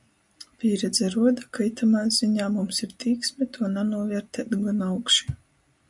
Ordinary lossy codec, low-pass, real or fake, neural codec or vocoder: MP3, 96 kbps; 10.8 kHz; real; none